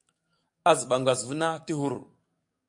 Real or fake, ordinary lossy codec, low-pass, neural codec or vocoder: fake; MP3, 64 kbps; 10.8 kHz; codec, 44.1 kHz, 7.8 kbps, DAC